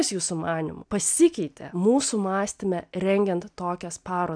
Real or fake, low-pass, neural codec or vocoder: real; 9.9 kHz; none